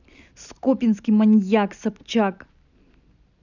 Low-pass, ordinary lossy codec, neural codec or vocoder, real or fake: 7.2 kHz; none; none; real